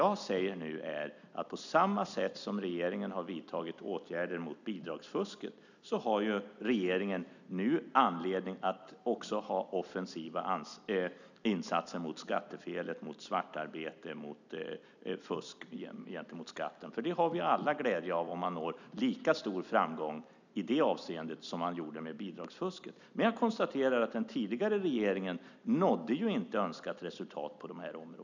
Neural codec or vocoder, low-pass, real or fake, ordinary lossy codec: none; 7.2 kHz; real; none